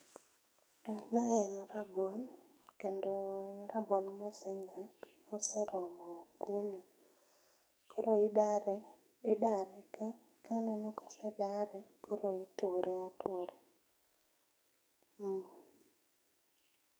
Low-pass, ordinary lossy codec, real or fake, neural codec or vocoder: none; none; fake; codec, 44.1 kHz, 2.6 kbps, SNAC